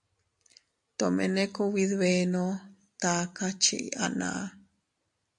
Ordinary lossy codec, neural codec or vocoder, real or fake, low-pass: AAC, 64 kbps; none; real; 10.8 kHz